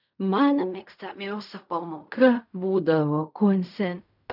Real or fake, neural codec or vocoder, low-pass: fake; codec, 16 kHz in and 24 kHz out, 0.4 kbps, LongCat-Audio-Codec, fine tuned four codebook decoder; 5.4 kHz